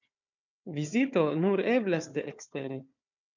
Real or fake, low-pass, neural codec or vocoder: fake; 7.2 kHz; codec, 16 kHz, 4 kbps, FunCodec, trained on Chinese and English, 50 frames a second